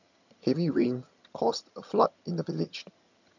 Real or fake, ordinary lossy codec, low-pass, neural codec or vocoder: fake; none; 7.2 kHz; vocoder, 22.05 kHz, 80 mel bands, HiFi-GAN